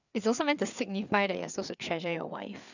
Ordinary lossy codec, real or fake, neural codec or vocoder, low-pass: none; fake; codec, 16 kHz, 4 kbps, FreqCodec, larger model; 7.2 kHz